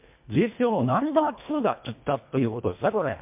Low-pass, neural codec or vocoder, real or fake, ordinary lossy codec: 3.6 kHz; codec, 24 kHz, 1.5 kbps, HILCodec; fake; MP3, 32 kbps